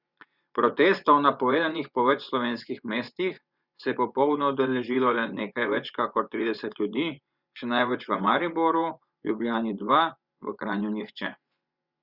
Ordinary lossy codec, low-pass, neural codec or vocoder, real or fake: Opus, 64 kbps; 5.4 kHz; vocoder, 24 kHz, 100 mel bands, Vocos; fake